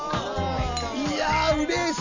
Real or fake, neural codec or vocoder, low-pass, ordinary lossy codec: real; none; 7.2 kHz; none